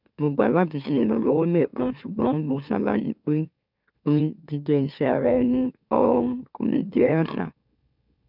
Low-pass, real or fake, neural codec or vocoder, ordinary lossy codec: 5.4 kHz; fake; autoencoder, 44.1 kHz, a latent of 192 numbers a frame, MeloTTS; none